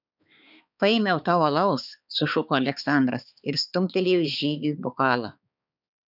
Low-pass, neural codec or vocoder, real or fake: 5.4 kHz; codec, 16 kHz, 4 kbps, X-Codec, HuBERT features, trained on balanced general audio; fake